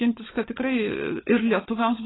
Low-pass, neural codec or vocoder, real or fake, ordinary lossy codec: 7.2 kHz; codec, 16 kHz, 16 kbps, FunCodec, trained on LibriTTS, 50 frames a second; fake; AAC, 16 kbps